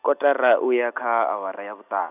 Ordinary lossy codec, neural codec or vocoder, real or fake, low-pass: none; none; real; 3.6 kHz